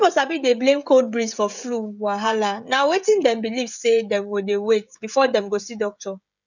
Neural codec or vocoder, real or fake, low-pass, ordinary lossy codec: codec, 16 kHz, 16 kbps, FreqCodec, smaller model; fake; 7.2 kHz; none